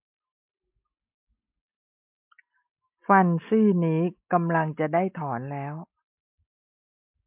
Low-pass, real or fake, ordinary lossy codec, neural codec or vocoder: 3.6 kHz; real; MP3, 32 kbps; none